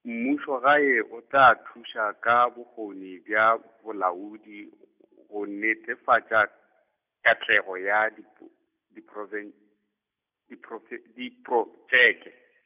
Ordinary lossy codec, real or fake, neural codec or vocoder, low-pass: none; real; none; 3.6 kHz